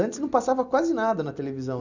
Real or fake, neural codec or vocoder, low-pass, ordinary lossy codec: real; none; 7.2 kHz; none